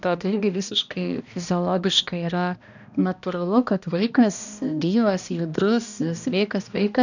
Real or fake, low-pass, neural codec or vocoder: fake; 7.2 kHz; codec, 16 kHz, 1 kbps, X-Codec, HuBERT features, trained on balanced general audio